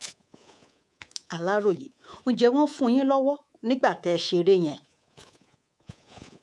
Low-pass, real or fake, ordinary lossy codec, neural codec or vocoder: none; fake; none; codec, 24 kHz, 3.1 kbps, DualCodec